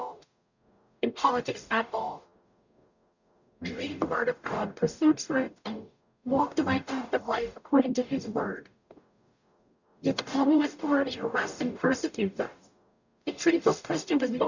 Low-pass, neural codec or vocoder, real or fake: 7.2 kHz; codec, 44.1 kHz, 0.9 kbps, DAC; fake